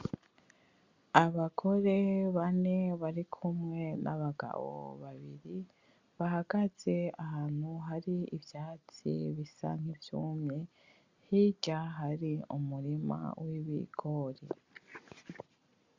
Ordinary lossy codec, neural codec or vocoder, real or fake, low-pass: Opus, 64 kbps; none; real; 7.2 kHz